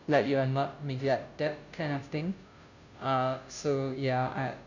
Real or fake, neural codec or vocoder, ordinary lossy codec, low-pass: fake; codec, 16 kHz, 0.5 kbps, FunCodec, trained on Chinese and English, 25 frames a second; none; 7.2 kHz